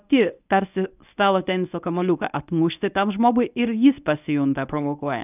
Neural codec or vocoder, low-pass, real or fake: codec, 24 kHz, 0.9 kbps, WavTokenizer, medium speech release version 1; 3.6 kHz; fake